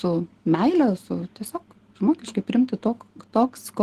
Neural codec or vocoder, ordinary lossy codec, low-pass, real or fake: none; Opus, 16 kbps; 14.4 kHz; real